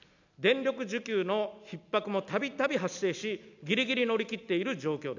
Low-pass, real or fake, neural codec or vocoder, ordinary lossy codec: 7.2 kHz; real; none; none